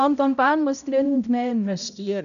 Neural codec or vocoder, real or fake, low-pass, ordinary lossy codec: codec, 16 kHz, 0.5 kbps, X-Codec, HuBERT features, trained on balanced general audio; fake; 7.2 kHz; none